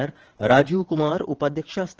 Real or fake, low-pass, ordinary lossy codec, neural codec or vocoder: fake; 7.2 kHz; Opus, 16 kbps; vocoder, 22.05 kHz, 80 mel bands, WaveNeXt